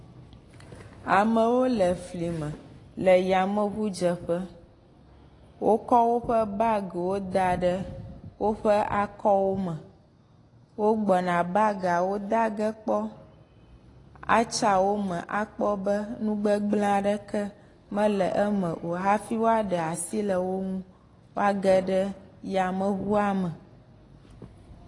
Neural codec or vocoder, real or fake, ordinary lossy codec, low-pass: none; real; AAC, 32 kbps; 10.8 kHz